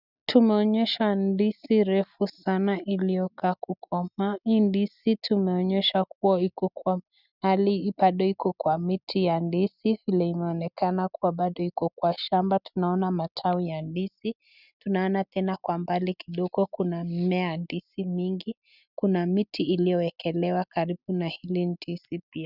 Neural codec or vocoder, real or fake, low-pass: none; real; 5.4 kHz